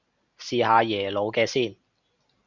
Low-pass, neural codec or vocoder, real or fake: 7.2 kHz; none; real